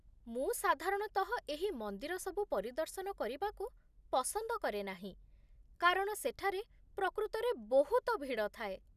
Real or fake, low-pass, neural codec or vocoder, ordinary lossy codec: real; 14.4 kHz; none; none